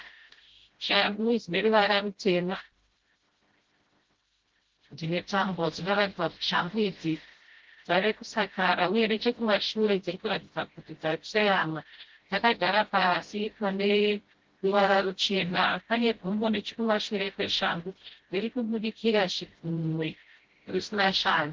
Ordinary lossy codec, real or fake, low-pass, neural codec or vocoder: Opus, 16 kbps; fake; 7.2 kHz; codec, 16 kHz, 0.5 kbps, FreqCodec, smaller model